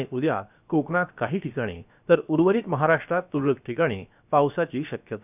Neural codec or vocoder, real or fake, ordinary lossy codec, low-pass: codec, 16 kHz, 0.7 kbps, FocalCodec; fake; none; 3.6 kHz